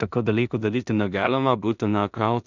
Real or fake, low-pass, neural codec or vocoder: fake; 7.2 kHz; codec, 16 kHz in and 24 kHz out, 0.4 kbps, LongCat-Audio-Codec, two codebook decoder